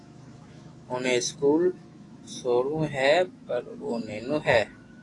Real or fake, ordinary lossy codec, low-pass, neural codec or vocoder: fake; AAC, 32 kbps; 10.8 kHz; autoencoder, 48 kHz, 128 numbers a frame, DAC-VAE, trained on Japanese speech